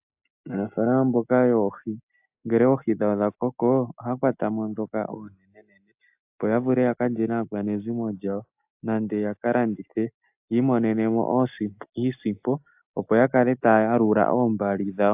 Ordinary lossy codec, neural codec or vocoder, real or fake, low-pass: AAC, 32 kbps; none; real; 3.6 kHz